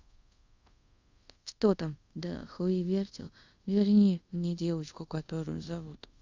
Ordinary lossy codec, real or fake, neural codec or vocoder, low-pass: Opus, 64 kbps; fake; codec, 24 kHz, 0.5 kbps, DualCodec; 7.2 kHz